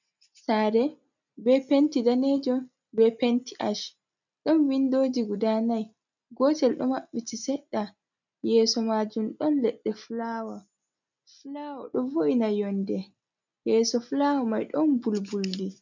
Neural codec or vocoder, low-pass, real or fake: none; 7.2 kHz; real